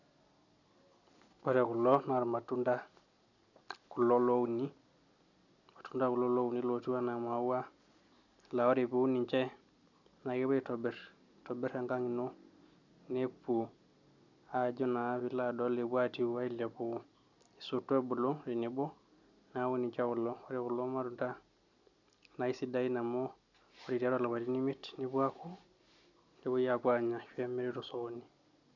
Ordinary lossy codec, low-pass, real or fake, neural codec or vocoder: none; 7.2 kHz; real; none